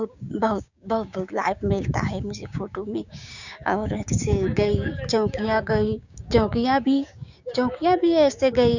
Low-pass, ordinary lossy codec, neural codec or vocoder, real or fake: 7.2 kHz; none; codec, 16 kHz, 6 kbps, DAC; fake